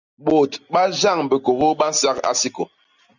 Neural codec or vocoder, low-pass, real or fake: none; 7.2 kHz; real